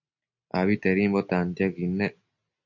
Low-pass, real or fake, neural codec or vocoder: 7.2 kHz; real; none